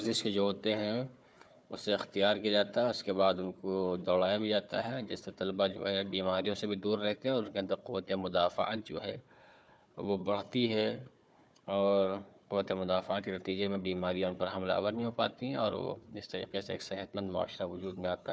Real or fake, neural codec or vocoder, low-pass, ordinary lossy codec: fake; codec, 16 kHz, 4 kbps, FunCodec, trained on Chinese and English, 50 frames a second; none; none